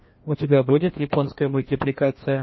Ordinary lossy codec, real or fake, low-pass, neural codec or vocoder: MP3, 24 kbps; fake; 7.2 kHz; codec, 16 kHz, 1 kbps, FreqCodec, larger model